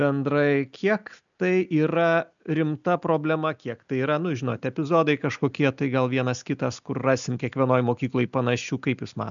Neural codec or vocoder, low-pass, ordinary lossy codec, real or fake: none; 7.2 kHz; MP3, 96 kbps; real